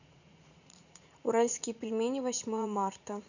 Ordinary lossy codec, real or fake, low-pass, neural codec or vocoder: none; fake; 7.2 kHz; vocoder, 44.1 kHz, 80 mel bands, Vocos